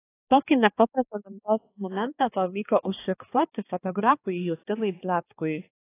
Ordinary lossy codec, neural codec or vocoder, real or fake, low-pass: AAC, 24 kbps; codec, 16 kHz, 2 kbps, X-Codec, HuBERT features, trained on balanced general audio; fake; 3.6 kHz